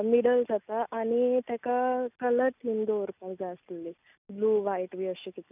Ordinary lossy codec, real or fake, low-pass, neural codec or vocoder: none; real; 3.6 kHz; none